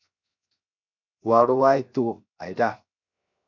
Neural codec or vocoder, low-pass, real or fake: codec, 16 kHz, 0.7 kbps, FocalCodec; 7.2 kHz; fake